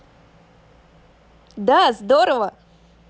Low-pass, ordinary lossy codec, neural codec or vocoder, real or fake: none; none; none; real